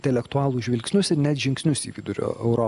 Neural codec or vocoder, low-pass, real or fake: none; 10.8 kHz; real